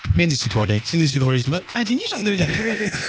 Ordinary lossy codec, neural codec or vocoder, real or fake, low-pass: none; codec, 16 kHz, 0.8 kbps, ZipCodec; fake; none